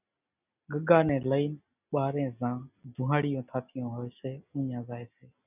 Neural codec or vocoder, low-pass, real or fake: none; 3.6 kHz; real